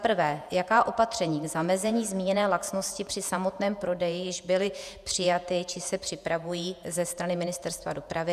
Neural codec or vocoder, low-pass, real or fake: vocoder, 48 kHz, 128 mel bands, Vocos; 14.4 kHz; fake